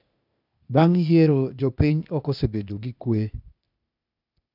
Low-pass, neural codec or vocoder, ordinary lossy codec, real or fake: 5.4 kHz; codec, 16 kHz, 0.8 kbps, ZipCodec; none; fake